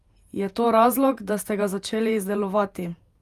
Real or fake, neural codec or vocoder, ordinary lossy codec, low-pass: fake; vocoder, 48 kHz, 128 mel bands, Vocos; Opus, 32 kbps; 14.4 kHz